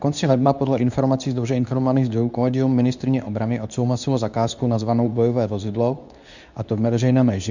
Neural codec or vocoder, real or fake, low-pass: codec, 24 kHz, 0.9 kbps, WavTokenizer, medium speech release version 2; fake; 7.2 kHz